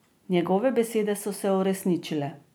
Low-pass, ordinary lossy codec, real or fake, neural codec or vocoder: none; none; real; none